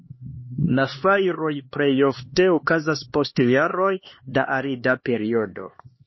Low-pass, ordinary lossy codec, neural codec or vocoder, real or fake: 7.2 kHz; MP3, 24 kbps; codec, 16 kHz, 2 kbps, X-Codec, HuBERT features, trained on LibriSpeech; fake